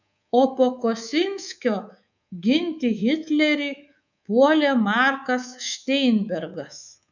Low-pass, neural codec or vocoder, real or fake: 7.2 kHz; codec, 24 kHz, 3.1 kbps, DualCodec; fake